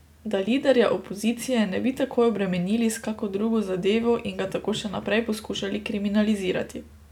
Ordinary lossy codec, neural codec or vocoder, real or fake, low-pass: none; none; real; 19.8 kHz